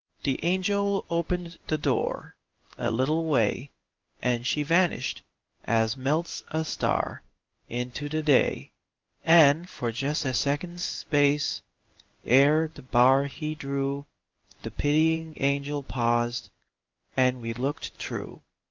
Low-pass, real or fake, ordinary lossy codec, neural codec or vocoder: 7.2 kHz; fake; Opus, 32 kbps; codec, 16 kHz in and 24 kHz out, 1 kbps, XY-Tokenizer